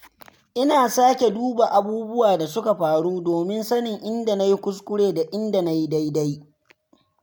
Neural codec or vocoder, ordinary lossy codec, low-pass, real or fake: none; none; none; real